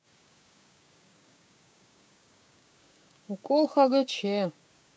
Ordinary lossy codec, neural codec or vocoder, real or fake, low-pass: none; codec, 16 kHz, 6 kbps, DAC; fake; none